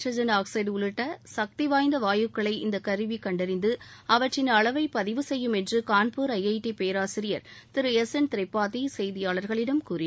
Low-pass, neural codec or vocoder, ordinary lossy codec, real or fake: none; none; none; real